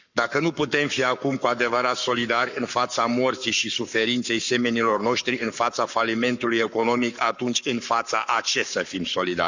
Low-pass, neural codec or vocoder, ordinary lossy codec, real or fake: 7.2 kHz; codec, 44.1 kHz, 7.8 kbps, Pupu-Codec; none; fake